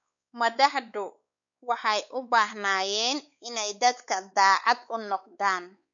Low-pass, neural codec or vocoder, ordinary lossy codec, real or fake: 7.2 kHz; codec, 16 kHz, 4 kbps, X-Codec, WavLM features, trained on Multilingual LibriSpeech; none; fake